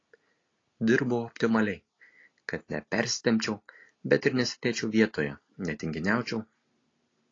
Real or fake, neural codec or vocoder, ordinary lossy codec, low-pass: real; none; AAC, 32 kbps; 7.2 kHz